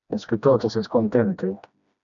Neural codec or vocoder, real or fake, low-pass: codec, 16 kHz, 1 kbps, FreqCodec, smaller model; fake; 7.2 kHz